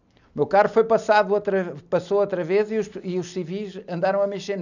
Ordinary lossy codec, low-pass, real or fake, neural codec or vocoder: none; 7.2 kHz; real; none